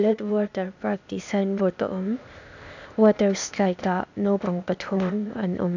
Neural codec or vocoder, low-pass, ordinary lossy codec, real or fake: codec, 16 kHz, 0.8 kbps, ZipCodec; 7.2 kHz; none; fake